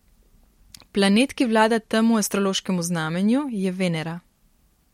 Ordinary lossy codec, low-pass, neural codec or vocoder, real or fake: MP3, 64 kbps; 19.8 kHz; none; real